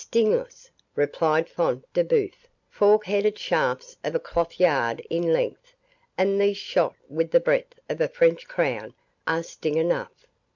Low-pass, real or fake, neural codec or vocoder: 7.2 kHz; real; none